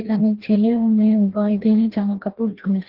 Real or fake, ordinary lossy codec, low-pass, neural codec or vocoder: fake; Opus, 16 kbps; 5.4 kHz; codec, 16 kHz, 1 kbps, FreqCodec, larger model